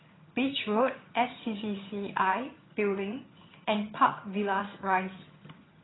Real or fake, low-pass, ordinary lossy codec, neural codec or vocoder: fake; 7.2 kHz; AAC, 16 kbps; vocoder, 22.05 kHz, 80 mel bands, HiFi-GAN